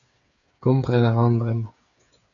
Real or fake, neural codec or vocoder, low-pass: fake; codec, 16 kHz, 8 kbps, FreqCodec, smaller model; 7.2 kHz